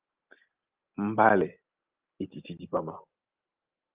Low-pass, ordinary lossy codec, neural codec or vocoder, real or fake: 3.6 kHz; Opus, 32 kbps; none; real